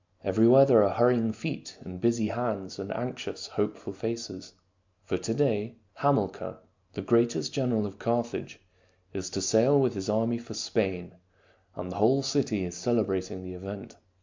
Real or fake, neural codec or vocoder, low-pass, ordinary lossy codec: real; none; 7.2 kHz; MP3, 64 kbps